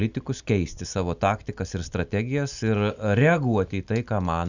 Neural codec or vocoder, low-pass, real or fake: none; 7.2 kHz; real